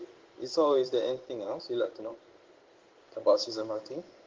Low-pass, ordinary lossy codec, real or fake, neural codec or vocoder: 7.2 kHz; Opus, 16 kbps; fake; codec, 16 kHz in and 24 kHz out, 1 kbps, XY-Tokenizer